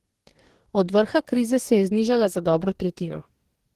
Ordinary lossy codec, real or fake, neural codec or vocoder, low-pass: Opus, 16 kbps; fake; codec, 44.1 kHz, 2.6 kbps, DAC; 14.4 kHz